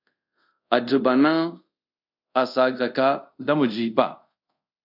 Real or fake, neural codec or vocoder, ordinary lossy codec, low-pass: fake; codec, 24 kHz, 0.5 kbps, DualCodec; AAC, 48 kbps; 5.4 kHz